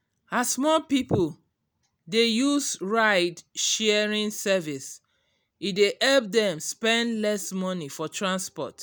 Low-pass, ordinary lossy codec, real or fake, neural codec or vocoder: none; none; real; none